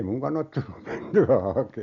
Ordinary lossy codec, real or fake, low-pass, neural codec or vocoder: none; real; 7.2 kHz; none